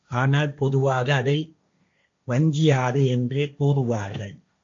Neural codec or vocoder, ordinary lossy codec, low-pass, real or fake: codec, 16 kHz, 1.1 kbps, Voila-Tokenizer; AAC, 64 kbps; 7.2 kHz; fake